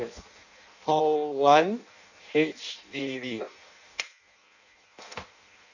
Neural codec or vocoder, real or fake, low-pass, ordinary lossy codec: codec, 16 kHz in and 24 kHz out, 0.6 kbps, FireRedTTS-2 codec; fake; 7.2 kHz; none